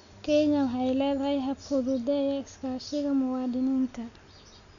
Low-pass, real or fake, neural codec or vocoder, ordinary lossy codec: 7.2 kHz; real; none; none